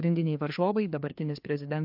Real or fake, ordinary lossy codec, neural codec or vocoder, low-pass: fake; MP3, 48 kbps; codec, 16 kHz, 2 kbps, FreqCodec, larger model; 5.4 kHz